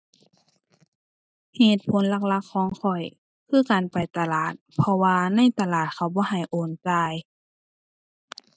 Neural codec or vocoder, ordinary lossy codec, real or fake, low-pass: none; none; real; none